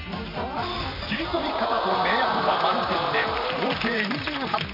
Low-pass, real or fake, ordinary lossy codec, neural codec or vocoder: 5.4 kHz; fake; none; vocoder, 44.1 kHz, 128 mel bands, Pupu-Vocoder